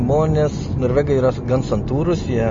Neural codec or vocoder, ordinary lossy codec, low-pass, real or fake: none; MP3, 32 kbps; 7.2 kHz; real